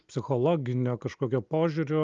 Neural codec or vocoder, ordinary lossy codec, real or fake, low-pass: none; Opus, 32 kbps; real; 7.2 kHz